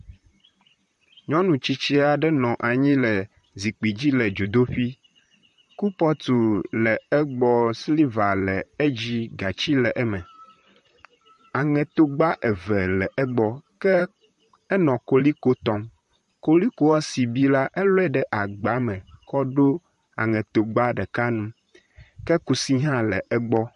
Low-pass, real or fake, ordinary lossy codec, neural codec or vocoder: 14.4 kHz; fake; MP3, 48 kbps; vocoder, 44.1 kHz, 128 mel bands, Pupu-Vocoder